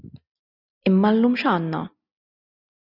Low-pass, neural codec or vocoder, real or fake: 5.4 kHz; none; real